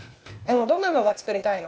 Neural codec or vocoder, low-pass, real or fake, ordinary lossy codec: codec, 16 kHz, 0.8 kbps, ZipCodec; none; fake; none